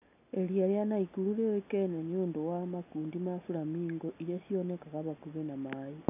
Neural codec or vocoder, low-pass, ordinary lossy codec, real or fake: none; 3.6 kHz; none; real